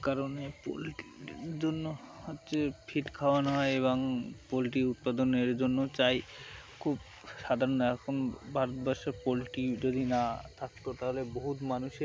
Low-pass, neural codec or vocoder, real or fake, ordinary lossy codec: none; none; real; none